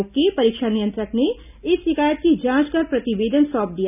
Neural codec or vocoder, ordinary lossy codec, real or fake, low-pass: none; none; real; 3.6 kHz